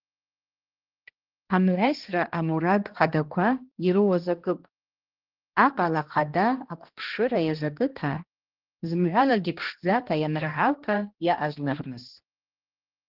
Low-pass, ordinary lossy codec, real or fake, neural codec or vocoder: 5.4 kHz; Opus, 16 kbps; fake; codec, 16 kHz, 1 kbps, X-Codec, HuBERT features, trained on balanced general audio